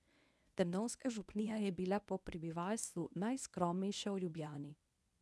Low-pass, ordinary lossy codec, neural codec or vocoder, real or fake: none; none; codec, 24 kHz, 0.9 kbps, WavTokenizer, medium speech release version 1; fake